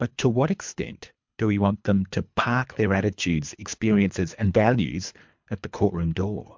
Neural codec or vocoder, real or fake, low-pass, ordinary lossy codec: codec, 24 kHz, 3 kbps, HILCodec; fake; 7.2 kHz; MP3, 64 kbps